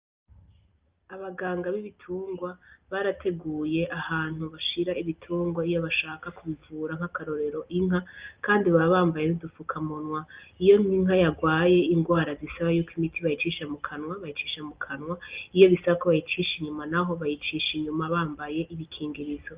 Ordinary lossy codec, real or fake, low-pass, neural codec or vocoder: Opus, 32 kbps; real; 3.6 kHz; none